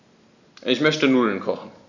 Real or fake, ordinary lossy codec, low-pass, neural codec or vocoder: real; AAC, 48 kbps; 7.2 kHz; none